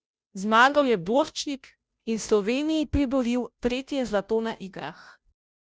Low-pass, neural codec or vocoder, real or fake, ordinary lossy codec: none; codec, 16 kHz, 0.5 kbps, FunCodec, trained on Chinese and English, 25 frames a second; fake; none